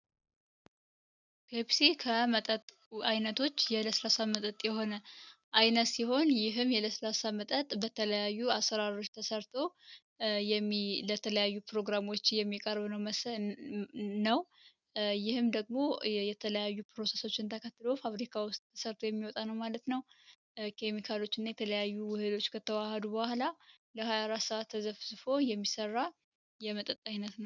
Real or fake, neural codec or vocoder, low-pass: real; none; 7.2 kHz